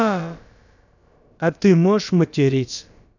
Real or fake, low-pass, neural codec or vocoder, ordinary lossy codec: fake; 7.2 kHz; codec, 16 kHz, about 1 kbps, DyCAST, with the encoder's durations; none